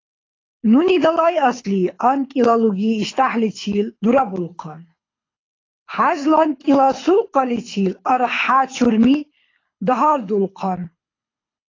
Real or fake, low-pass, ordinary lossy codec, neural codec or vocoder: fake; 7.2 kHz; AAC, 32 kbps; codec, 24 kHz, 6 kbps, HILCodec